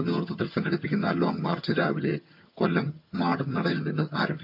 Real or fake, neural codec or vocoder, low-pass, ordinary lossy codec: fake; vocoder, 22.05 kHz, 80 mel bands, HiFi-GAN; 5.4 kHz; MP3, 48 kbps